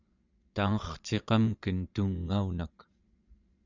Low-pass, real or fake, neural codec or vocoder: 7.2 kHz; fake; vocoder, 22.05 kHz, 80 mel bands, Vocos